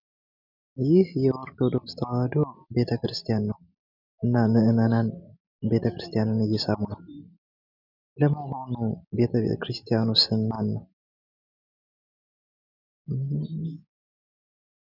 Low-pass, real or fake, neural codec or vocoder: 5.4 kHz; real; none